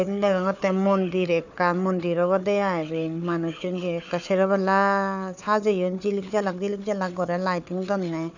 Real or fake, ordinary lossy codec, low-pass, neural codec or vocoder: fake; none; 7.2 kHz; codec, 16 kHz, 4 kbps, FunCodec, trained on Chinese and English, 50 frames a second